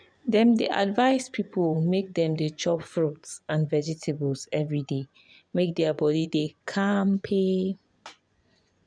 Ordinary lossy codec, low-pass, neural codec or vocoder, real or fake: none; 9.9 kHz; vocoder, 24 kHz, 100 mel bands, Vocos; fake